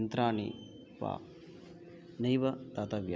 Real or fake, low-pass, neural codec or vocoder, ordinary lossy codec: real; none; none; none